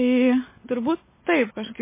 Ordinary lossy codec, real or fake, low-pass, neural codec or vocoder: MP3, 16 kbps; real; 3.6 kHz; none